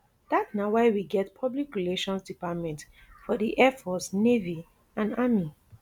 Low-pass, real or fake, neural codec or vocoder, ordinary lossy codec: 19.8 kHz; real; none; none